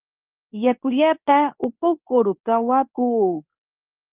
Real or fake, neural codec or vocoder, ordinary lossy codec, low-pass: fake; codec, 24 kHz, 0.9 kbps, WavTokenizer, medium speech release version 2; Opus, 24 kbps; 3.6 kHz